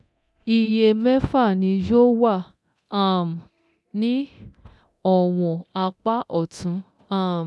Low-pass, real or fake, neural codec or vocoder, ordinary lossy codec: none; fake; codec, 24 kHz, 0.9 kbps, DualCodec; none